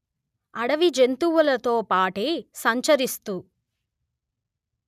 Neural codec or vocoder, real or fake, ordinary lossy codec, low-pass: none; real; none; 14.4 kHz